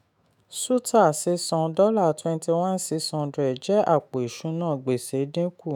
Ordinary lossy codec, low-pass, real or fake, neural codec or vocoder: none; none; fake; autoencoder, 48 kHz, 128 numbers a frame, DAC-VAE, trained on Japanese speech